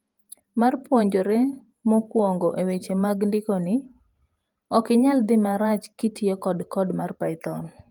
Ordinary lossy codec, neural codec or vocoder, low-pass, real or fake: Opus, 32 kbps; vocoder, 48 kHz, 128 mel bands, Vocos; 19.8 kHz; fake